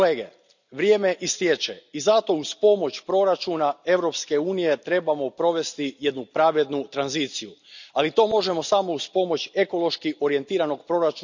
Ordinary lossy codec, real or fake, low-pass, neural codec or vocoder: none; real; 7.2 kHz; none